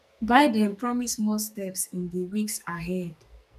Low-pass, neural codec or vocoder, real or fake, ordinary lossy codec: 14.4 kHz; codec, 44.1 kHz, 2.6 kbps, SNAC; fake; none